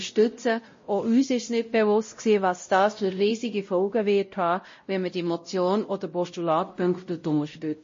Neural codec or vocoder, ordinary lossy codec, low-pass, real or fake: codec, 16 kHz, 0.5 kbps, X-Codec, WavLM features, trained on Multilingual LibriSpeech; MP3, 32 kbps; 7.2 kHz; fake